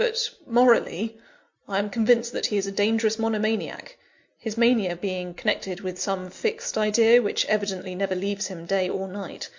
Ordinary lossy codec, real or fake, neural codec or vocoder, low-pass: MP3, 48 kbps; real; none; 7.2 kHz